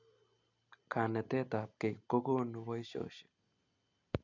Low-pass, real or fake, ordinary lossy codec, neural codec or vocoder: 7.2 kHz; real; none; none